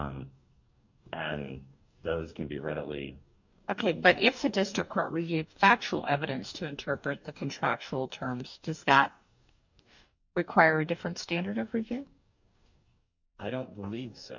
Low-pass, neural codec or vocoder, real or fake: 7.2 kHz; codec, 44.1 kHz, 2.6 kbps, DAC; fake